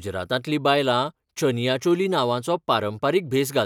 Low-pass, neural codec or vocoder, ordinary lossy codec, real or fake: 19.8 kHz; none; none; real